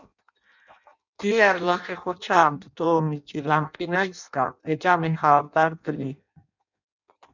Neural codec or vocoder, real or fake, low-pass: codec, 16 kHz in and 24 kHz out, 0.6 kbps, FireRedTTS-2 codec; fake; 7.2 kHz